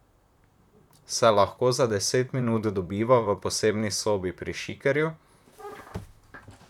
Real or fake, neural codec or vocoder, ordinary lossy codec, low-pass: fake; vocoder, 44.1 kHz, 128 mel bands, Pupu-Vocoder; none; 19.8 kHz